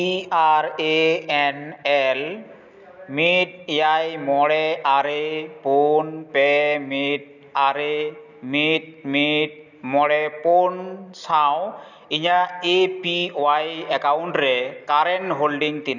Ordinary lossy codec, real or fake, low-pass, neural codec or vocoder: none; real; 7.2 kHz; none